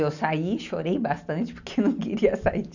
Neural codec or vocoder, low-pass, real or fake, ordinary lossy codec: none; 7.2 kHz; real; none